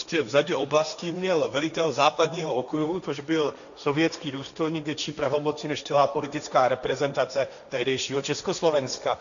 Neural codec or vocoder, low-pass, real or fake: codec, 16 kHz, 1.1 kbps, Voila-Tokenizer; 7.2 kHz; fake